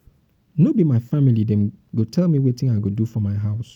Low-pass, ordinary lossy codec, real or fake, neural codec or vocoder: 19.8 kHz; none; real; none